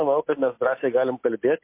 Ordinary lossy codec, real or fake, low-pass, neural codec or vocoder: MP3, 24 kbps; real; 3.6 kHz; none